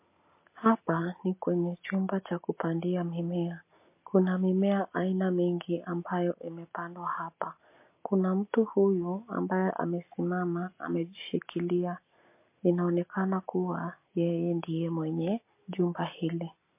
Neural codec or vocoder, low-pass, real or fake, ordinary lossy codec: none; 3.6 kHz; real; MP3, 24 kbps